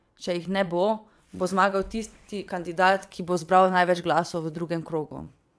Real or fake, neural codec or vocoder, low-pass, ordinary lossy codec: fake; vocoder, 22.05 kHz, 80 mel bands, WaveNeXt; none; none